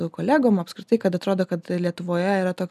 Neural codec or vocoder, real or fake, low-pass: none; real; 14.4 kHz